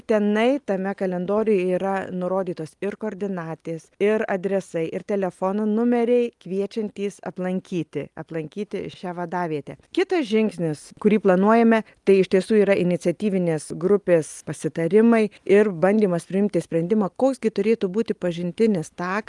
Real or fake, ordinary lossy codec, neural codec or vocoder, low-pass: real; Opus, 32 kbps; none; 10.8 kHz